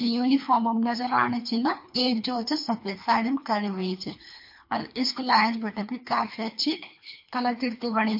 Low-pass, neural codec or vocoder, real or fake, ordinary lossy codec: 5.4 kHz; codec, 24 kHz, 3 kbps, HILCodec; fake; MP3, 32 kbps